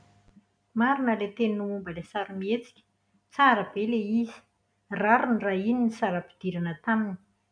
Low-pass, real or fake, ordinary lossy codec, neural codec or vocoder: 9.9 kHz; real; MP3, 96 kbps; none